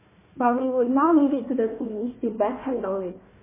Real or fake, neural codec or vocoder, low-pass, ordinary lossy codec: fake; codec, 16 kHz, 1 kbps, FunCodec, trained on Chinese and English, 50 frames a second; 3.6 kHz; MP3, 16 kbps